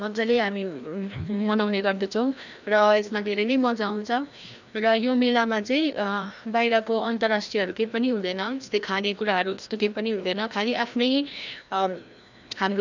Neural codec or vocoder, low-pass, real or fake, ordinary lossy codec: codec, 16 kHz, 1 kbps, FreqCodec, larger model; 7.2 kHz; fake; none